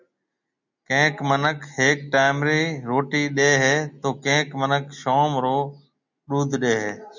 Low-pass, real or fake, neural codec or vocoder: 7.2 kHz; real; none